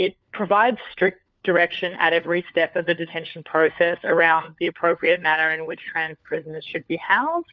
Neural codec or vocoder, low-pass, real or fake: codec, 16 kHz, 4 kbps, FunCodec, trained on LibriTTS, 50 frames a second; 7.2 kHz; fake